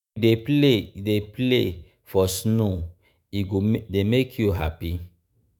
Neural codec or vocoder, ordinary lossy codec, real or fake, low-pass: autoencoder, 48 kHz, 128 numbers a frame, DAC-VAE, trained on Japanese speech; none; fake; none